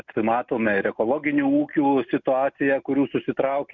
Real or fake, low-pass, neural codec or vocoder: real; 7.2 kHz; none